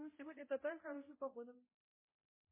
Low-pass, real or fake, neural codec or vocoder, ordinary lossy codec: 3.6 kHz; fake; codec, 16 kHz, 0.5 kbps, X-Codec, HuBERT features, trained on balanced general audio; MP3, 16 kbps